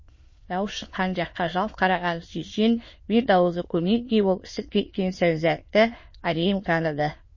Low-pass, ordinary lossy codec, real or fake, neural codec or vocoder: 7.2 kHz; MP3, 32 kbps; fake; autoencoder, 22.05 kHz, a latent of 192 numbers a frame, VITS, trained on many speakers